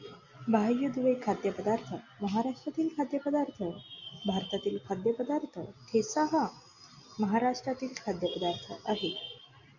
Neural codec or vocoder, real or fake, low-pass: none; real; 7.2 kHz